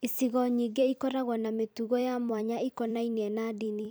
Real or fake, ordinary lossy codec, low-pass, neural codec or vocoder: fake; none; none; vocoder, 44.1 kHz, 128 mel bands every 256 samples, BigVGAN v2